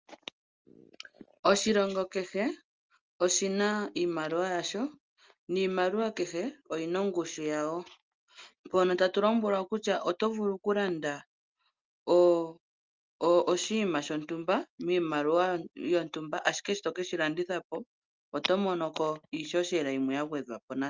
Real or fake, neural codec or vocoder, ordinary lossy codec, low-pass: real; none; Opus, 24 kbps; 7.2 kHz